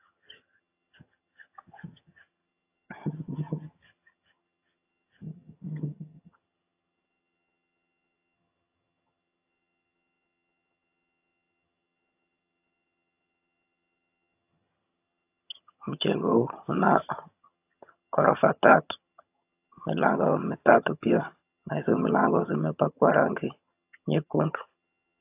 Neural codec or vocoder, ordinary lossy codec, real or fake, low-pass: vocoder, 22.05 kHz, 80 mel bands, HiFi-GAN; AAC, 32 kbps; fake; 3.6 kHz